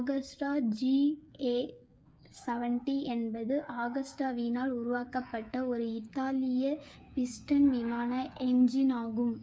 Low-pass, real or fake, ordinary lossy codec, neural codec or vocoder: none; fake; none; codec, 16 kHz, 8 kbps, FreqCodec, smaller model